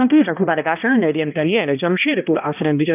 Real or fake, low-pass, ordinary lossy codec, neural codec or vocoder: fake; 3.6 kHz; none; codec, 16 kHz, 1 kbps, X-Codec, HuBERT features, trained on balanced general audio